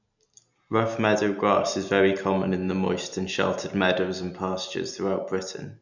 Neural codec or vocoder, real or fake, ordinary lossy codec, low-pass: none; real; none; 7.2 kHz